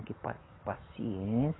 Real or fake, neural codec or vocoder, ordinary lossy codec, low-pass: real; none; AAC, 16 kbps; 7.2 kHz